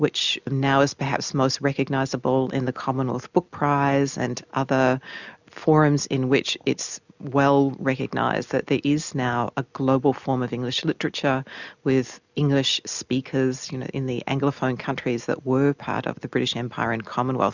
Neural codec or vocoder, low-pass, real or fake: none; 7.2 kHz; real